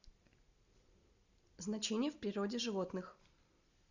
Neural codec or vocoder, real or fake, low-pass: none; real; 7.2 kHz